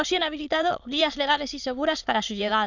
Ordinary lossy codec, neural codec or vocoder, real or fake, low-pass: none; autoencoder, 22.05 kHz, a latent of 192 numbers a frame, VITS, trained on many speakers; fake; 7.2 kHz